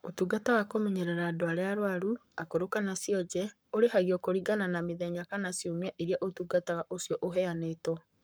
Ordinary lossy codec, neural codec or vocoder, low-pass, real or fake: none; codec, 44.1 kHz, 7.8 kbps, Pupu-Codec; none; fake